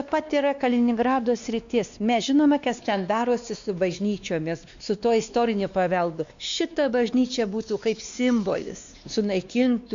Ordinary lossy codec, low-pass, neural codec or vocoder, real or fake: MP3, 64 kbps; 7.2 kHz; codec, 16 kHz, 2 kbps, X-Codec, WavLM features, trained on Multilingual LibriSpeech; fake